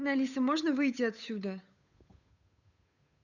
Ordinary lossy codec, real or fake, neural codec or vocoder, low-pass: none; fake; codec, 16 kHz, 8 kbps, FunCodec, trained on Chinese and English, 25 frames a second; 7.2 kHz